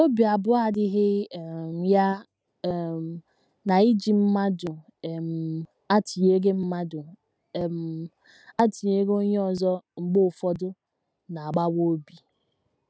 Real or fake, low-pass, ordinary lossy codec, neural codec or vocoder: real; none; none; none